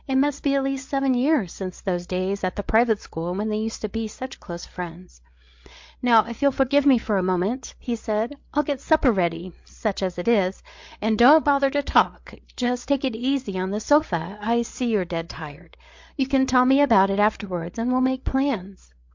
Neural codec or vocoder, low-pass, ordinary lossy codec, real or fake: codec, 16 kHz, 8 kbps, FreqCodec, larger model; 7.2 kHz; MP3, 48 kbps; fake